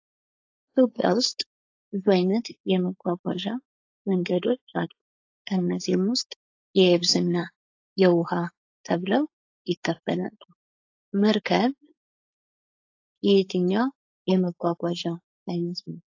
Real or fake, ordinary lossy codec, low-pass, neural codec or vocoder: fake; AAC, 48 kbps; 7.2 kHz; codec, 16 kHz, 4.8 kbps, FACodec